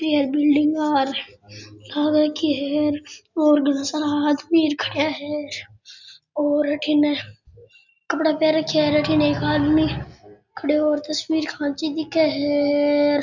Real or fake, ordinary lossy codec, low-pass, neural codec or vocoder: real; none; 7.2 kHz; none